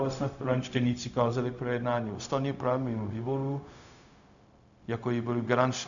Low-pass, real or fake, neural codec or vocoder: 7.2 kHz; fake; codec, 16 kHz, 0.4 kbps, LongCat-Audio-Codec